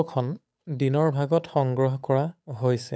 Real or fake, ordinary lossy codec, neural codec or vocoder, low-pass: fake; none; codec, 16 kHz, 8 kbps, FunCodec, trained on Chinese and English, 25 frames a second; none